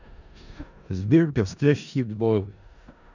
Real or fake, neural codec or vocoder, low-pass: fake; codec, 16 kHz in and 24 kHz out, 0.4 kbps, LongCat-Audio-Codec, four codebook decoder; 7.2 kHz